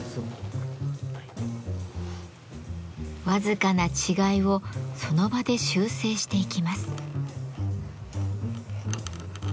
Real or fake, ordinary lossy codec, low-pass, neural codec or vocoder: real; none; none; none